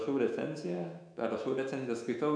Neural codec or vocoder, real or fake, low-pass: autoencoder, 48 kHz, 128 numbers a frame, DAC-VAE, trained on Japanese speech; fake; 9.9 kHz